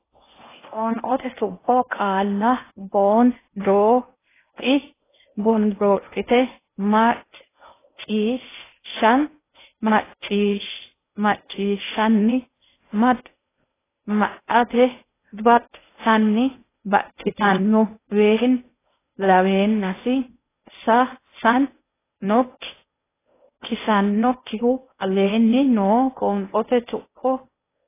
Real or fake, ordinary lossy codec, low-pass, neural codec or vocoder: fake; AAC, 16 kbps; 3.6 kHz; codec, 16 kHz in and 24 kHz out, 0.6 kbps, FocalCodec, streaming, 2048 codes